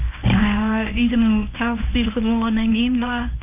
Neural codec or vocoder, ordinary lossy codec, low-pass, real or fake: codec, 24 kHz, 0.9 kbps, WavTokenizer, medium speech release version 1; none; 3.6 kHz; fake